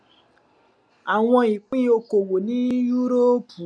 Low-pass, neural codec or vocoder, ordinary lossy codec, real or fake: none; none; none; real